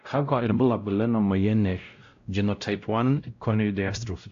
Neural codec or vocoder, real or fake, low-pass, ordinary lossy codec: codec, 16 kHz, 0.5 kbps, X-Codec, WavLM features, trained on Multilingual LibriSpeech; fake; 7.2 kHz; AAC, 48 kbps